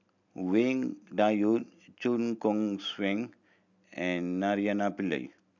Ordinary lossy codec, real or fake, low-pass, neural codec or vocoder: none; real; 7.2 kHz; none